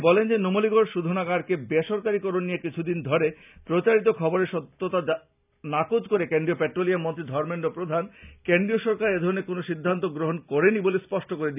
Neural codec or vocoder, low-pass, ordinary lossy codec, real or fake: none; 3.6 kHz; none; real